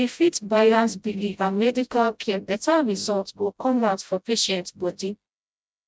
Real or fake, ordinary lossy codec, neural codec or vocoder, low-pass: fake; none; codec, 16 kHz, 0.5 kbps, FreqCodec, smaller model; none